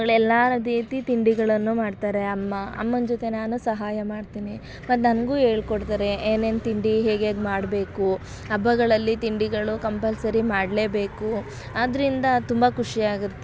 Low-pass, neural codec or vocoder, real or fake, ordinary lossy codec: none; none; real; none